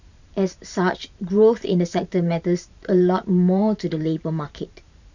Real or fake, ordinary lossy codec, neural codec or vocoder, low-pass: fake; none; vocoder, 44.1 kHz, 128 mel bands every 512 samples, BigVGAN v2; 7.2 kHz